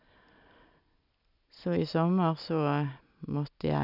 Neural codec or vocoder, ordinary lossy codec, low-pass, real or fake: none; none; 5.4 kHz; real